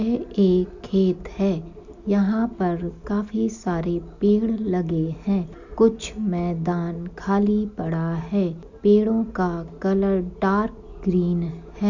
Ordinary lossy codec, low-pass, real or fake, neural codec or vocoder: none; 7.2 kHz; real; none